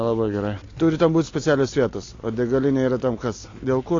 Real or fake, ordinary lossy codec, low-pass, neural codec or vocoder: real; AAC, 32 kbps; 7.2 kHz; none